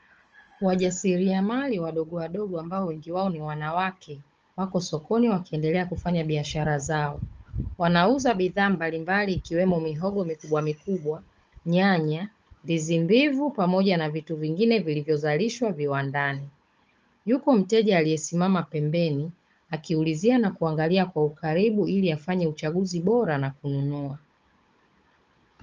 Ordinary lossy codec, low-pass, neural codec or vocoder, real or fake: Opus, 24 kbps; 7.2 kHz; codec, 16 kHz, 16 kbps, FunCodec, trained on Chinese and English, 50 frames a second; fake